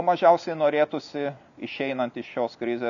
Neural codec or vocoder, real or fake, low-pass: none; real; 7.2 kHz